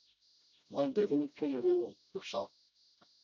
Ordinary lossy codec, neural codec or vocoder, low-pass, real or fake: MP3, 64 kbps; codec, 16 kHz, 0.5 kbps, FreqCodec, smaller model; 7.2 kHz; fake